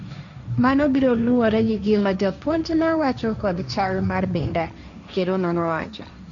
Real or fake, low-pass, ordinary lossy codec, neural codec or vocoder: fake; 7.2 kHz; Opus, 64 kbps; codec, 16 kHz, 1.1 kbps, Voila-Tokenizer